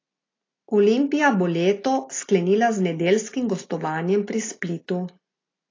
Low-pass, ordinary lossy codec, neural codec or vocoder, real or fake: 7.2 kHz; AAC, 32 kbps; none; real